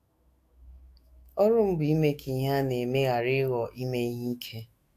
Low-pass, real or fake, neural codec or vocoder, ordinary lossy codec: 14.4 kHz; fake; autoencoder, 48 kHz, 128 numbers a frame, DAC-VAE, trained on Japanese speech; AAC, 64 kbps